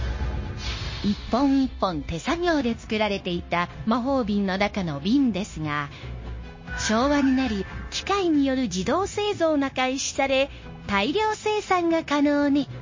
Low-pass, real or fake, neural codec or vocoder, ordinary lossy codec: 7.2 kHz; fake; codec, 16 kHz, 0.9 kbps, LongCat-Audio-Codec; MP3, 32 kbps